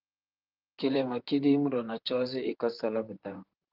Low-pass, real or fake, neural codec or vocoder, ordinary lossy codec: 5.4 kHz; fake; vocoder, 44.1 kHz, 128 mel bands, Pupu-Vocoder; Opus, 32 kbps